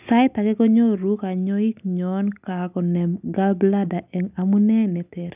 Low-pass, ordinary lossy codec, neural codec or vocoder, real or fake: 3.6 kHz; none; none; real